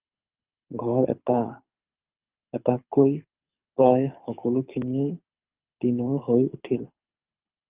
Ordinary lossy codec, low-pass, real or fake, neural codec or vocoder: Opus, 32 kbps; 3.6 kHz; fake; codec, 24 kHz, 6 kbps, HILCodec